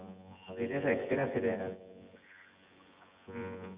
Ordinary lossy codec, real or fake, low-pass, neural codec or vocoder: Opus, 64 kbps; fake; 3.6 kHz; vocoder, 24 kHz, 100 mel bands, Vocos